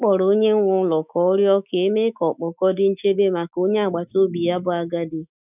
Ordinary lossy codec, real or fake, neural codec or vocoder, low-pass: none; fake; autoencoder, 48 kHz, 128 numbers a frame, DAC-VAE, trained on Japanese speech; 3.6 kHz